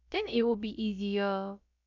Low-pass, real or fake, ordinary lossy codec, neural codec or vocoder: 7.2 kHz; fake; none; codec, 16 kHz, about 1 kbps, DyCAST, with the encoder's durations